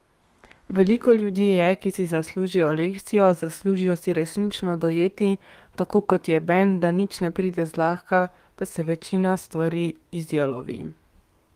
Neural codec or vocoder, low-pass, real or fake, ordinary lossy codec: codec, 32 kHz, 1.9 kbps, SNAC; 14.4 kHz; fake; Opus, 32 kbps